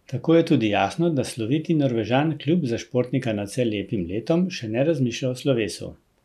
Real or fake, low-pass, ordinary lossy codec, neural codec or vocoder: real; 14.4 kHz; none; none